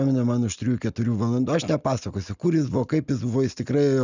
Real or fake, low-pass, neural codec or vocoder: real; 7.2 kHz; none